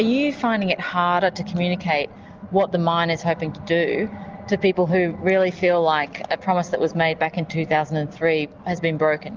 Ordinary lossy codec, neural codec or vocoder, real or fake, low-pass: Opus, 24 kbps; none; real; 7.2 kHz